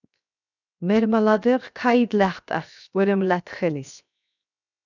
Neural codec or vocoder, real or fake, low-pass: codec, 16 kHz, 0.7 kbps, FocalCodec; fake; 7.2 kHz